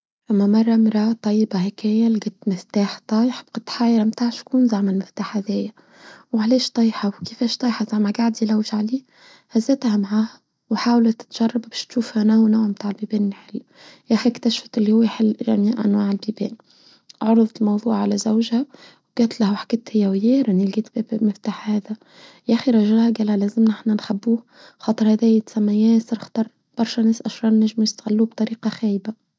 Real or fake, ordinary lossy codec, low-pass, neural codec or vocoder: real; none; none; none